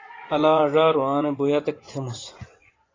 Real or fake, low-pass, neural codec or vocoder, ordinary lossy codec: fake; 7.2 kHz; vocoder, 24 kHz, 100 mel bands, Vocos; AAC, 32 kbps